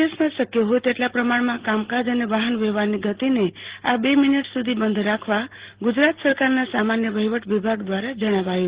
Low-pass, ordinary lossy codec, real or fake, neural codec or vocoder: 3.6 kHz; Opus, 16 kbps; real; none